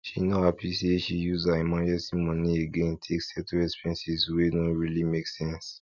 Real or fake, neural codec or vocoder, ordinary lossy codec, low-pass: real; none; none; 7.2 kHz